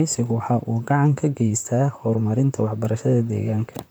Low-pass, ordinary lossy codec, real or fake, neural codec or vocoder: none; none; fake; vocoder, 44.1 kHz, 128 mel bands, Pupu-Vocoder